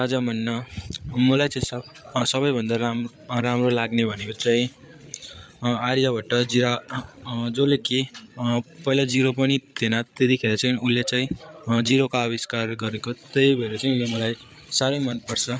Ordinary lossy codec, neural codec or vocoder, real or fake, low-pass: none; codec, 16 kHz, 8 kbps, FreqCodec, larger model; fake; none